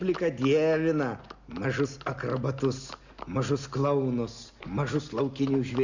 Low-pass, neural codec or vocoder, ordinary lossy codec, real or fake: 7.2 kHz; none; Opus, 64 kbps; real